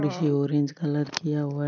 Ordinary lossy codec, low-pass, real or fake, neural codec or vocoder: none; 7.2 kHz; real; none